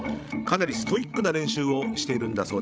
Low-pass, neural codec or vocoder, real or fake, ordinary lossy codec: none; codec, 16 kHz, 16 kbps, FreqCodec, larger model; fake; none